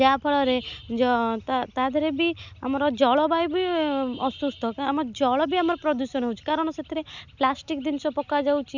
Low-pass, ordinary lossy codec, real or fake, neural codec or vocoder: 7.2 kHz; none; real; none